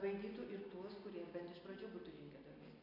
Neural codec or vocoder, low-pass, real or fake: none; 5.4 kHz; real